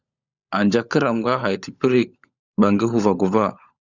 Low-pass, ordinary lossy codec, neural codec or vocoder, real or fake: 7.2 kHz; Opus, 64 kbps; codec, 16 kHz, 16 kbps, FunCodec, trained on LibriTTS, 50 frames a second; fake